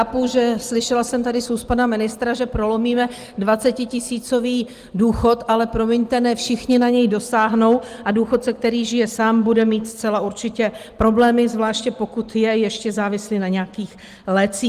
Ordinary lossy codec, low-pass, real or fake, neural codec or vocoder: Opus, 24 kbps; 14.4 kHz; real; none